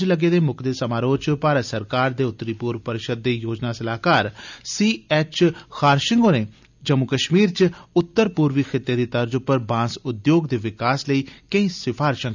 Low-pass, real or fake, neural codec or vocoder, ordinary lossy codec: 7.2 kHz; real; none; none